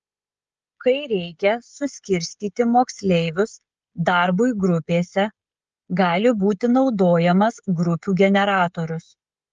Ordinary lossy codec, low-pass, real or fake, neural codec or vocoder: Opus, 24 kbps; 7.2 kHz; fake; codec, 16 kHz, 16 kbps, FreqCodec, smaller model